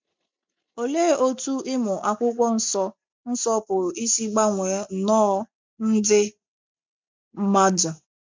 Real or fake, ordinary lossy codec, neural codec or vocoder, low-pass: real; MP3, 64 kbps; none; 7.2 kHz